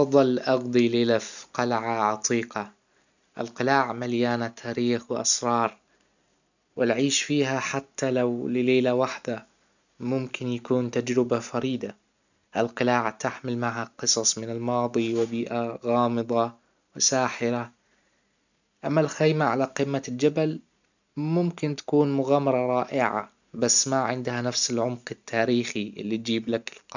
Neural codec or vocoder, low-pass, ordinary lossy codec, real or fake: none; 7.2 kHz; none; real